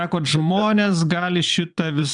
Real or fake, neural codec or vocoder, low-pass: fake; vocoder, 22.05 kHz, 80 mel bands, Vocos; 9.9 kHz